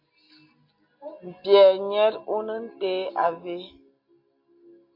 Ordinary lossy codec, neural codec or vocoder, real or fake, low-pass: MP3, 32 kbps; none; real; 5.4 kHz